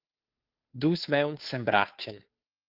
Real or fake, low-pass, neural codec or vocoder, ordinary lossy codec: fake; 5.4 kHz; codec, 16 kHz, 2 kbps, FunCodec, trained on Chinese and English, 25 frames a second; Opus, 32 kbps